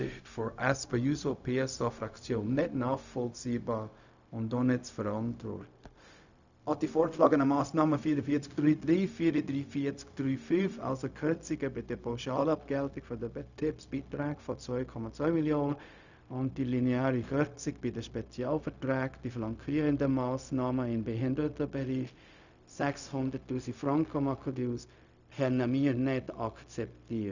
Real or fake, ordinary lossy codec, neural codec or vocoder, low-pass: fake; none; codec, 16 kHz, 0.4 kbps, LongCat-Audio-Codec; 7.2 kHz